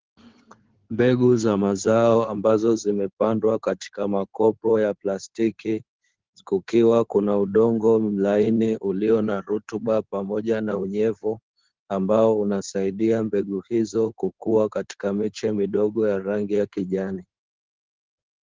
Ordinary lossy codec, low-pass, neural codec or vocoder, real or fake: Opus, 16 kbps; 7.2 kHz; codec, 16 kHz in and 24 kHz out, 2.2 kbps, FireRedTTS-2 codec; fake